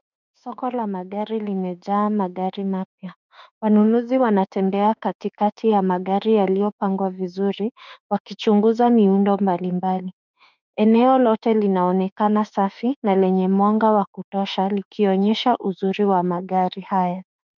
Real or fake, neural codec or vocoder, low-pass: fake; autoencoder, 48 kHz, 32 numbers a frame, DAC-VAE, trained on Japanese speech; 7.2 kHz